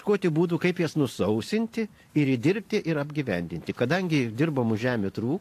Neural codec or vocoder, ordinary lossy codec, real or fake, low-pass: vocoder, 44.1 kHz, 128 mel bands every 512 samples, BigVGAN v2; AAC, 64 kbps; fake; 14.4 kHz